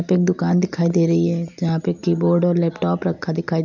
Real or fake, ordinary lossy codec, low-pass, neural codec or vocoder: real; none; 7.2 kHz; none